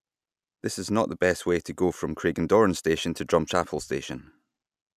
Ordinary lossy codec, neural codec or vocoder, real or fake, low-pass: none; none; real; 14.4 kHz